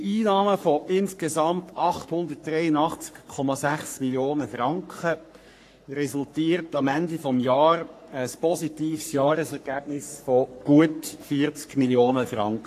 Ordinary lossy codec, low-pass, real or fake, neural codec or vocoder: AAC, 64 kbps; 14.4 kHz; fake; codec, 44.1 kHz, 3.4 kbps, Pupu-Codec